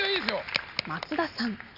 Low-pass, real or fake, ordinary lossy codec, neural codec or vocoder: 5.4 kHz; real; none; none